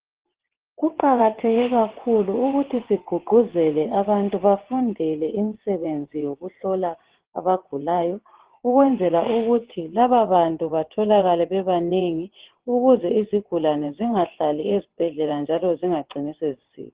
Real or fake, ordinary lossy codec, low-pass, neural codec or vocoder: fake; Opus, 16 kbps; 3.6 kHz; vocoder, 22.05 kHz, 80 mel bands, WaveNeXt